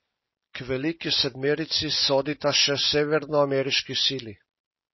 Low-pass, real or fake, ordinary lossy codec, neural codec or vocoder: 7.2 kHz; real; MP3, 24 kbps; none